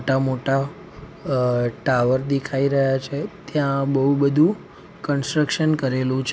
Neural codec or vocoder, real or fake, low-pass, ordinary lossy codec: none; real; none; none